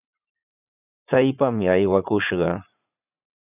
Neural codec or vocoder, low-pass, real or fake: vocoder, 44.1 kHz, 80 mel bands, Vocos; 3.6 kHz; fake